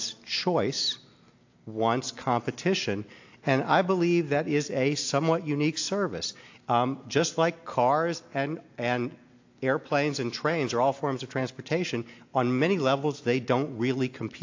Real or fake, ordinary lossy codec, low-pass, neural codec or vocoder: real; AAC, 48 kbps; 7.2 kHz; none